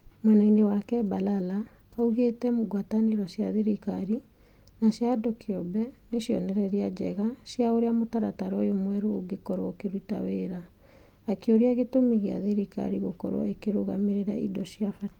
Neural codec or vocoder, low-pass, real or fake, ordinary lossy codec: none; 19.8 kHz; real; Opus, 32 kbps